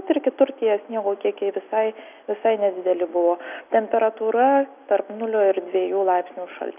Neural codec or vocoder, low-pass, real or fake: none; 3.6 kHz; real